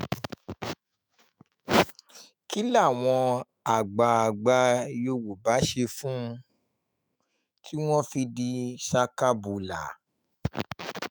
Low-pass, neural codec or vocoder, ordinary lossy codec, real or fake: none; autoencoder, 48 kHz, 128 numbers a frame, DAC-VAE, trained on Japanese speech; none; fake